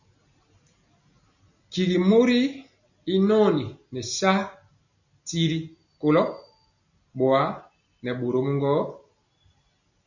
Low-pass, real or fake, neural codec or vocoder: 7.2 kHz; real; none